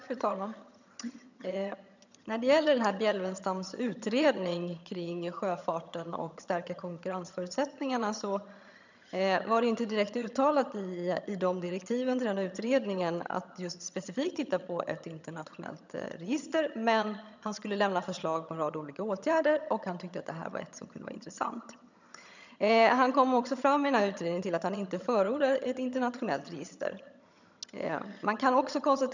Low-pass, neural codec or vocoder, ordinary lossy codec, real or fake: 7.2 kHz; vocoder, 22.05 kHz, 80 mel bands, HiFi-GAN; none; fake